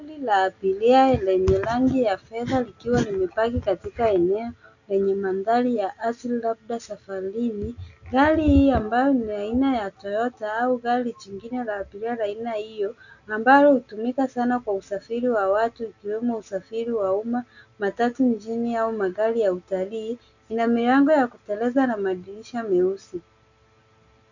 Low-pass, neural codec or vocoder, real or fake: 7.2 kHz; none; real